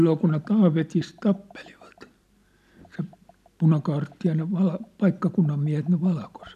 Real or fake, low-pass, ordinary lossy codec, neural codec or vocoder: real; 14.4 kHz; none; none